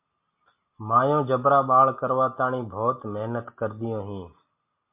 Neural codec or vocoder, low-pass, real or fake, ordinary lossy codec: none; 3.6 kHz; real; MP3, 32 kbps